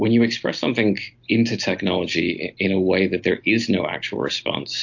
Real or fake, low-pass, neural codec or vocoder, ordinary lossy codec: real; 7.2 kHz; none; MP3, 48 kbps